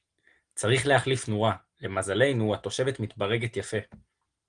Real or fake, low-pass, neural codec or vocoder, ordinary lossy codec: real; 9.9 kHz; none; Opus, 24 kbps